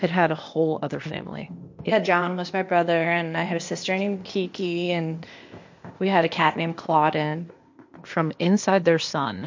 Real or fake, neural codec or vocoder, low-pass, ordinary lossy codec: fake; codec, 16 kHz, 0.8 kbps, ZipCodec; 7.2 kHz; MP3, 48 kbps